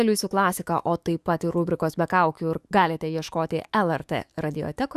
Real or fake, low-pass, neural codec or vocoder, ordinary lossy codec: fake; 14.4 kHz; autoencoder, 48 kHz, 128 numbers a frame, DAC-VAE, trained on Japanese speech; Opus, 64 kbps